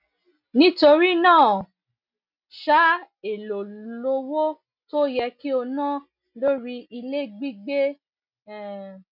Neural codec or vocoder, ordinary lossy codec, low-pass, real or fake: none; none; 5.4 kHz; real